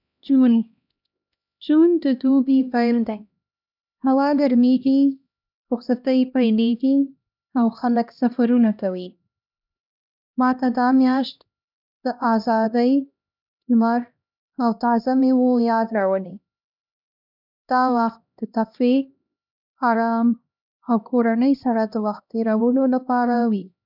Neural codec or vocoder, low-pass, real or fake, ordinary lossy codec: codec, 16 kHz, 1 kbps, X-Codec, HuBERT features, trained on LibriSpeech; 5.4 kHz; fake; none